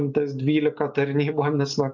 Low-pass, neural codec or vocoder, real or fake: 7.2 kHz; none; real